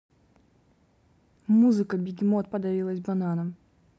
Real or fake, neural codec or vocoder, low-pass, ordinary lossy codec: real; none; none; none